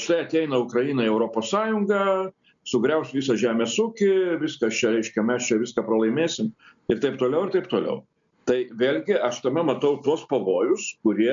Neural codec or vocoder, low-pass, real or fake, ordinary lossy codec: none; 7.2 kHz; real; MP3, 64 kbps